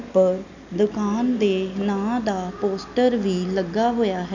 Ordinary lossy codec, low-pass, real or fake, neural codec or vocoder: none; 7.2 kHz; real; none